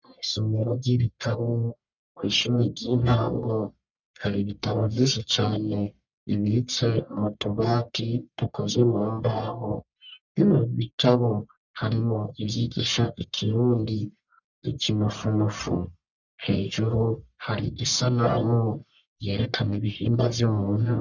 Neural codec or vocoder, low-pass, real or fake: codec, 44.1 kHz, 1.7 kbps, Pupu-Codec; 7.2 kHz; fake